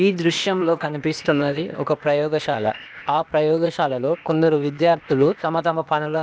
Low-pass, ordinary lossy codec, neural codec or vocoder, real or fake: none; none; codec, 16 kHz, 0.8 kbps, ZipCodec; fake